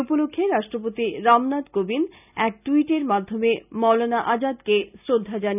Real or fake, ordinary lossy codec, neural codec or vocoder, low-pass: real; none; none; 3.6 kHz